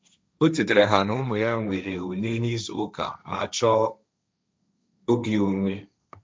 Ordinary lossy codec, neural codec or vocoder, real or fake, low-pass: none; codec, 16 kHz, 1.1 kbps, Voila-Tokenizer; fake; none